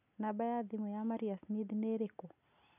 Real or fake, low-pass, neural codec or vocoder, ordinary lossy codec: real; 3.6 kHz; none; none